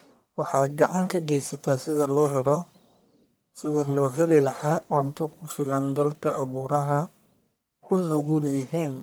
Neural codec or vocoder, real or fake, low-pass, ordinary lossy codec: codec, 44.1 kHz, 1.7 kbps, Pupu-Codec; fake; none; none